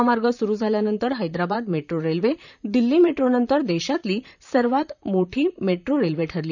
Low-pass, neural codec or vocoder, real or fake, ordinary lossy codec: 7.2 kHz; vocoder, 44.1 kHz, 128 mel bands, Pupu-Vocoder; fake; none